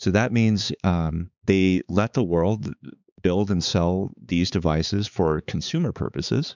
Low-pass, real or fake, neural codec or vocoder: 7.2 kHz; fake; codec, 16 kHz, 4 kbps, X-Codec, HuBERT features, trained on balanced general audio